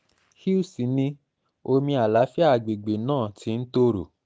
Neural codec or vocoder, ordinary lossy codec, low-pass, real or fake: none; none; none; real